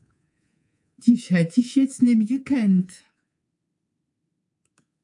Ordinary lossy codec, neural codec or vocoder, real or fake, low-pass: AAC, 64 kbps; codec, 24 kHz, 3.1 kbps, DualCodec; fake; 10.8 kHz